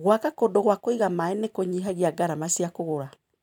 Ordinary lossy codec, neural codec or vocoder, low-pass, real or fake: none; vocoder, 44.1 kHz, 128 mel bands every 512 samples, BigVGAN v2; 19.8 kHz; fake